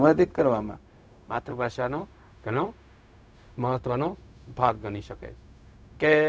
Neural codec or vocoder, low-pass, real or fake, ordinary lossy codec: codec, 16 kHz, 0.4 kbps, LongCat-Audio-Codec; none; fake; none